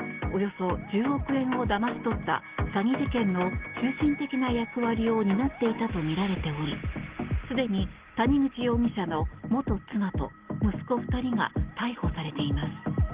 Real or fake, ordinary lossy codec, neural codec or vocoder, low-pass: real; Opus, 16 kbps; none; 3.6 kHz